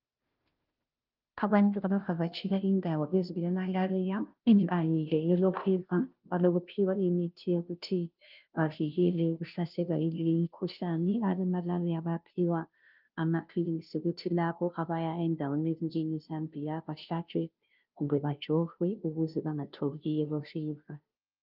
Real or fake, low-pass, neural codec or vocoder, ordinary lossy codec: fake; 5.4 kHz; codec, 16 kHz, 0.5 kbps, FunCodec, trained on Chinese and English, 25 frames a second; Opus, 24 kbps